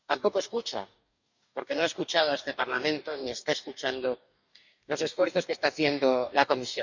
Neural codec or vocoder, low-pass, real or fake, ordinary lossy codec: codec, 44.1 kHz, 2.6 kbps, DAC; 7.2 kHz; fake; none